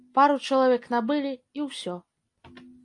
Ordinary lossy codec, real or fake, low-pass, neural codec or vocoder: AAC, 48 kbps; real; 10.8 kHz; none